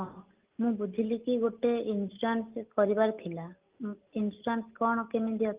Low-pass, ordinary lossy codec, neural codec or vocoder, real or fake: 3.6 kHz; Opus, 32 kbps; none; real